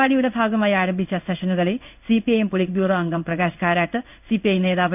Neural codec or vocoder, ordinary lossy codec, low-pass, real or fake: codec, 16 kHz in and 24 kHz out, 1 kbps, XY-Tokenizer; none; 3.6 kHz; fake